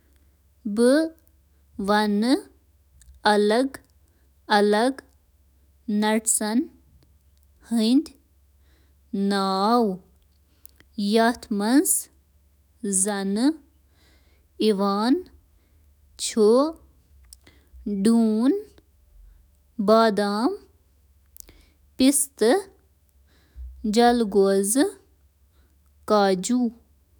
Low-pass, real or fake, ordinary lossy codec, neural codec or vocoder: none; fake; none; autoencoder, 48 kHz, 128 numbers a frame, DAC-VAE, trained on Japanese speech